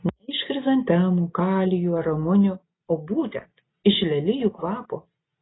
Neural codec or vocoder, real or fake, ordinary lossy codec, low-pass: none; real; AAC, 16 kbps; 7.2 kHz